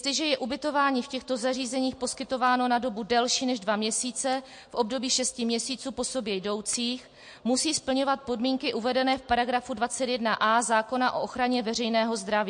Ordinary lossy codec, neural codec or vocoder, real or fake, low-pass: MP3, 48 kbps; none; real; 9.9 kHz